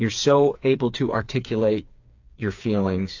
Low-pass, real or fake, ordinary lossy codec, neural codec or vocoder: 7.2 kHz; fake; AAC, 48 kbps; codec, 16 kHz, 4 kbps, FreqCodec, smaller model